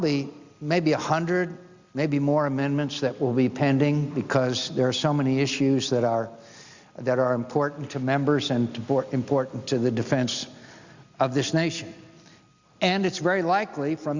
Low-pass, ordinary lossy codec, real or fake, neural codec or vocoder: 7.2 kHz; Opus, 64 kbps; real; none